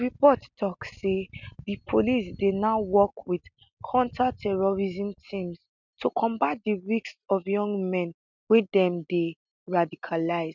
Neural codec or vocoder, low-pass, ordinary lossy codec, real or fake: none; 7.2 kHz; none; real